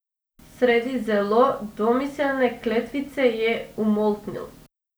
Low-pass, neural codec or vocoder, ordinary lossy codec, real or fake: none; none; none; real